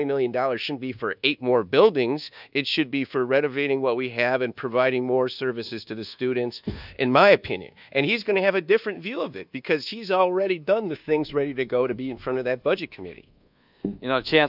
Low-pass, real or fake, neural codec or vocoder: 5.4 kHz; fake; codec, 24 kHz, 1.2 kbps, DualCodec